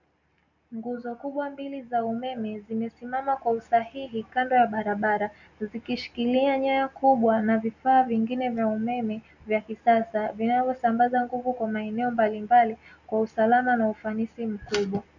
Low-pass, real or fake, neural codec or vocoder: 7.2 kHz; real; none